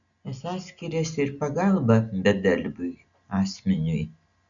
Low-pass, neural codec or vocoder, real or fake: 7.2 kHz; none; real